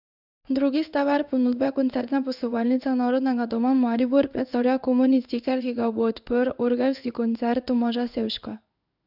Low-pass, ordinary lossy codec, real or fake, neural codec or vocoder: 5.4 kHz; none; fake; codec, 16 kHz in and 24 kHz out, 1 kbps, XY-Tokenizer